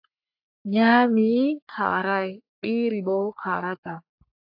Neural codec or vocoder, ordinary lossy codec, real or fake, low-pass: codec, 44.1 kHz, 3.4 kbps, Pupu-Codec; AAC, 48 kbps; fake; 5.4 kHz